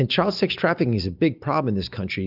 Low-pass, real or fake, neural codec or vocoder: 5.4 kHz; real; none